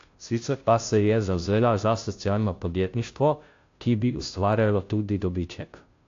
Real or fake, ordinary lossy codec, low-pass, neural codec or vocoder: fake; AAC, 48 kbps; 7.2 kHz; codec, 16 kHz, 0.5 kbps, FunCodec, trained on Chinese and English, 25 frames a second